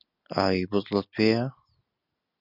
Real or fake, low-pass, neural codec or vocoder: real; 5.4 kHz; none